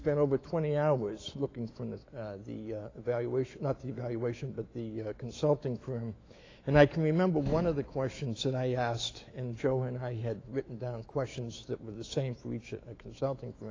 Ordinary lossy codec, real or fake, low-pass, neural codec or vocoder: AAC, 32 kbps; fake; 7.2 kHz; autoencoder, 48 kHz, 128 numbers a frame, DAC-VAE, trained on Japanese speech